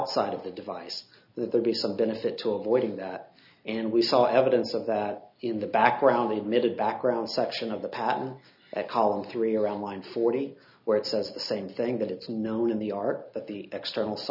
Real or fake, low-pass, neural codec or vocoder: real; 5.4 kHz; none